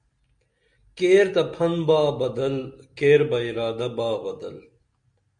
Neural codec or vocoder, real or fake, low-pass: none; real; 9.9 kHz